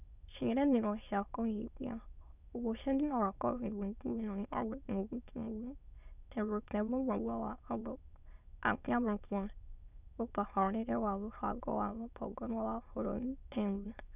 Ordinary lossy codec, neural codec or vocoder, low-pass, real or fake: none; autoencoder, 22.05 kHz, a latent of 192 numbers a frame, VITS, trained on many speakers; 3.6 kHz; fake